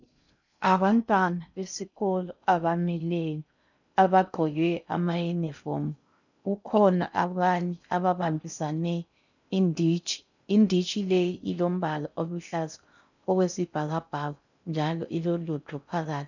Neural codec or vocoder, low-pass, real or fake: codec, 16 kHz in and 24 kHz out, 0.6 kbps, FocalCodec, streaming, 4096 codes; 7.2 kHz; fake